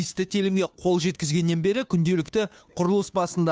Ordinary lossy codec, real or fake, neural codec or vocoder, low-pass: none; fake; codec, 16 kHz, 2 kbps, FunCodec, trained on Chinese and English, 25 frames a second; none